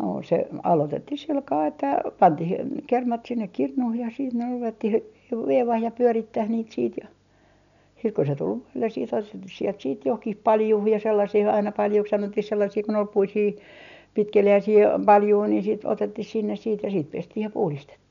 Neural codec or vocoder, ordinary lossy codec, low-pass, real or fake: none; none; 7.2 kHz; real